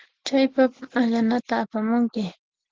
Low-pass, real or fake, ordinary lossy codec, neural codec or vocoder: 7.2 kHz; real; Opus, 16 kbps; none